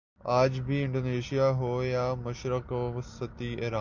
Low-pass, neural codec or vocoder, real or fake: 7.2 kHz; none; real